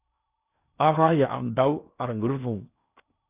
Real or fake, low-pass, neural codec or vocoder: fake; 3.6 kHz; codec, 16 kHz in and 24 kHz out, 0.8 kbps, FocalCodec, streaming, 65536 codes